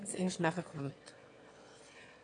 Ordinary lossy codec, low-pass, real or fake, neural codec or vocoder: AAC, 48 kbps; 9.9 kHz; fake; autoencoder, 22.05 kHz, a latent of 192 numbers a frame, VITS, trained on one speaker